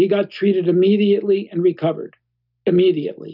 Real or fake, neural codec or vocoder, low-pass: real; none; 5.4 kHz